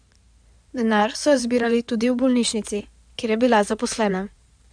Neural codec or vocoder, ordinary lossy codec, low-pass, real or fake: codec, 16 kHz in and 24 kHz out, 2.2 kbps, FireRedTTS-2 codec; none; 9.9 kHz; fake